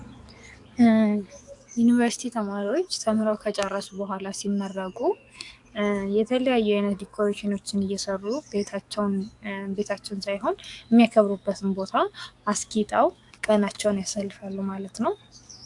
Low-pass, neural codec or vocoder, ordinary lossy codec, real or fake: 10.8 kHz; codec, 44.1 kHz, 7.8 kbps, DAC; AAC, 64 kbps; fake